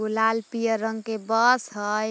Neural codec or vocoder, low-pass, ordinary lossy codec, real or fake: none; none; none; real